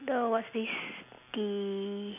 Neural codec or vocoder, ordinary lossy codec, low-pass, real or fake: none; none; 3.6 kHz; real